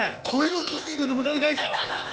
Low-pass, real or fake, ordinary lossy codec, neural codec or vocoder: none; fake; none; codec, 16 kHz, 0.8 kbps, ZipCodec